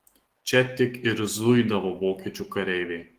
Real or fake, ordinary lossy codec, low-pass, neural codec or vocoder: fake; Opus, 16 kbps; 14.4 kHz; autoencoder, 48 kHz, 128 numbers a frame, DAC-VAE, trained on Japanese speech